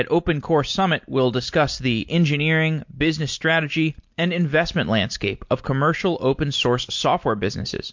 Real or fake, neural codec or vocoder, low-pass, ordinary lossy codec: real; none; 7.2 kHz; MP3, 48 kbps